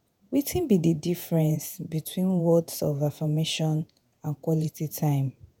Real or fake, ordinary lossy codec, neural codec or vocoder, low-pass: fake; none; vocoder, 48 kHz, 128 mel bands, Vocos; none